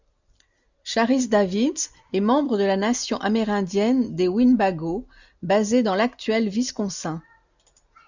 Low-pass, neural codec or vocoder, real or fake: 7.2 kHz; none; real